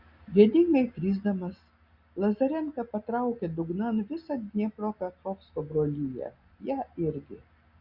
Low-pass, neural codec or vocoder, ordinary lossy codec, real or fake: 5.4 kHz; none; Opus, 64 kbps; real